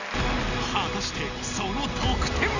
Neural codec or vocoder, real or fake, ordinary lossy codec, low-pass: none; real; none; 7.2 kHz